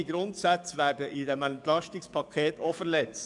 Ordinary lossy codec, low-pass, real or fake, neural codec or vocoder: MP3, 96 kbps; 10.8 kHz; fake; codec, 44.1 kHz, 7.8 kbps, DAC